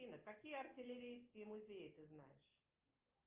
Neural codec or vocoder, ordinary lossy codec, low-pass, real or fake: none; Opus, 32 kbps; 3.6 kHz; real